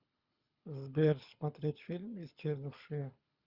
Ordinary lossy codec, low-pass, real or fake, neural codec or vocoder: Opus, 64 kbps; 5.4 kHz; fake; codec, 24 kHz, 6 kbps, HILCodec